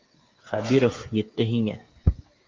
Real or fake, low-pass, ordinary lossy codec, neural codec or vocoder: fake; 7.2 kHz; Opus, 32 kbps; codec, 16 kHz, 8 kbps, FreqCodec, smaller model